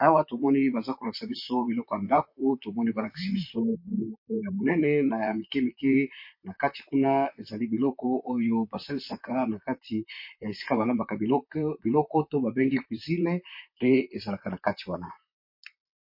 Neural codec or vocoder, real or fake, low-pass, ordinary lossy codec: vocoder, 44.1 kHz, 128 mel bands, Pupu-Vocoder; fake; 5.4 kHz; MP3, 32 kbps